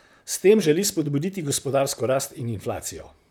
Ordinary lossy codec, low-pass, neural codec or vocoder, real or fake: none; none; vocoder, 44.1 kHz, 128 mel bands, Pupu-Vocoder; fake